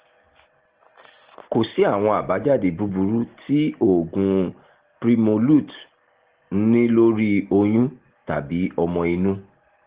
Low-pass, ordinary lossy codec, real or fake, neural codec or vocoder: 3.6 kHz; Opus, 16 kbps; real; none